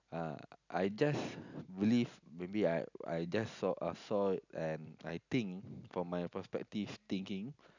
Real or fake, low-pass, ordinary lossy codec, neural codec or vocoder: real; 7.2 kHz; none; none